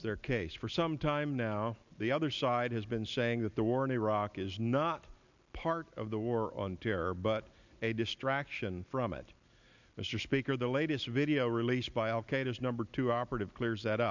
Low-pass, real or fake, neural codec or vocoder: 7.2 kHz; real; none